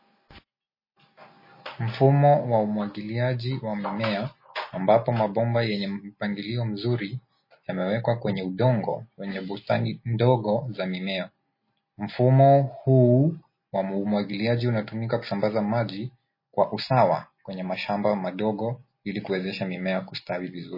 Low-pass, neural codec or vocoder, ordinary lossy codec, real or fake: 5.4 kHz; none; MP3, 24 kbps; real